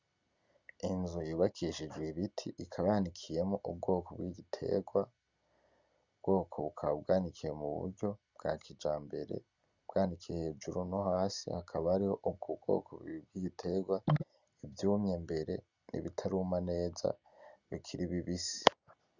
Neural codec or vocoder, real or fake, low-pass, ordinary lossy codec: none; real; 7.2 kHz; Opus, 64 kbps